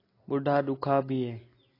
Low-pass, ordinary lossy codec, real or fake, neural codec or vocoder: 5.4 kHz; AAC, 24 kbps; real; none